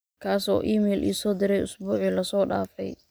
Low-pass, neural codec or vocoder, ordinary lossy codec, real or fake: none; none; none; real